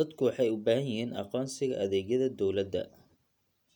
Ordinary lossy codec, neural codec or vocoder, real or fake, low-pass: none; none; real; 19.8 kHz